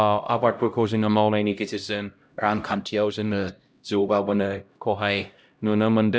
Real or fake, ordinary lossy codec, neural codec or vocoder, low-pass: fake; none; codec, 16 kHz, 0.5 kbps, X-Codec, HuBERT features, trained on LibriSpeech; none